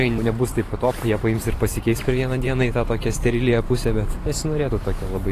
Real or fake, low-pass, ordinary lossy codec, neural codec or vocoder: fake; 14.4 kHz; AAC, 48 kbps; vocoder, 44.1 kHz, 128 mel bands every 512 samples, BigVGAN v2